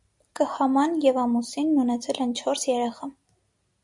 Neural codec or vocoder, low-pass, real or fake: none; 10.8 kHz; real